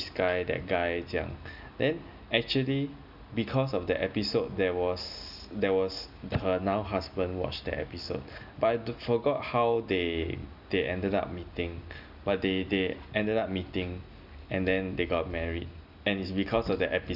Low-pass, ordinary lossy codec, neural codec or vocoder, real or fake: 5.4 kHz; none; none; real